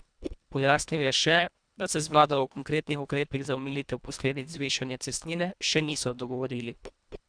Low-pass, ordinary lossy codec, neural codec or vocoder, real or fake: 9.9 kHz; none; codec, 24 kHz, 1.5 kbps, HILCodec; fake